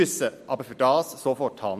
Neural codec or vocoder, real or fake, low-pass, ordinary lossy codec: none; real; 14.4 kHz; MP3, 64 kbps